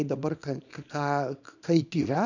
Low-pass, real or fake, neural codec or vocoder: 7.2 kHz; fake; codec, 24 kHz, 0.9 kbps, WavTokenizer, small release